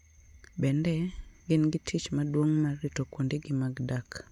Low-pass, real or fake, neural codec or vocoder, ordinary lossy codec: 19.8 kHz; real; none; none